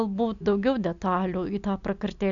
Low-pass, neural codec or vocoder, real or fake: 7.2 kHz; none; real